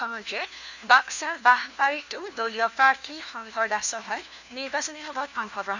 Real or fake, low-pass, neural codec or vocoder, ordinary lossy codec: fake; 7.2 kHz; codec, 16 kHz, 1 kbps, FunCodec, trained on LibriTTS, 50 frames a second; none